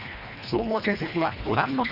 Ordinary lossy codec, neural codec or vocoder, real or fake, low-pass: Opus, 64 kbps; codec, 24 kHz, 1.5 kbps, HILCodec; fake; 5.4 kHz